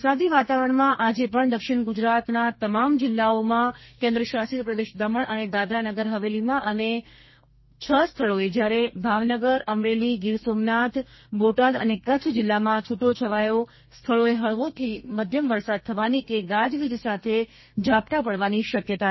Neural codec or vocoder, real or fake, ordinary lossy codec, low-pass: codec, 32 kHz, 1.9 kbps, SNAC; fake; MP3, 24 kbps; 7.2 kHz